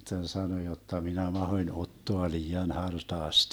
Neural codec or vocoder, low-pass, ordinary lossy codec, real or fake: none; none; none; real